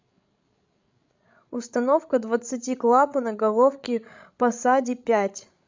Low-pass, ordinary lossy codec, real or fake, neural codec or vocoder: 7.2 kHz; MP3, 64 kbps; fake; codec, 16 kHz, 16 kbps, FreqCodec, larger model